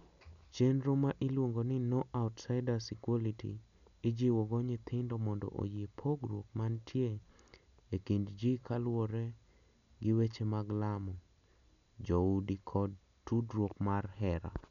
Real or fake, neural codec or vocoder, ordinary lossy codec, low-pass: real; none; none; 7.2 kHz